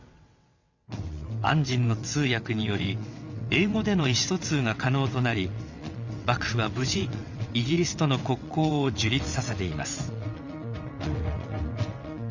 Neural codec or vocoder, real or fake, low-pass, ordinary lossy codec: vocoder, 22.05 kHz, 80 mel bands, WaveNeXt; fake; 7.2 kHz; none